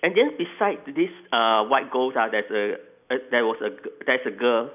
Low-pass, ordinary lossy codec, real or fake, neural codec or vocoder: 3.6 kHz; none; real; none